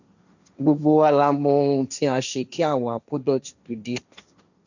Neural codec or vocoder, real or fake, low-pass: codec, 16 kHz, 1.1 kbps, Voila-Tokenizer; fake; 7.2 kHz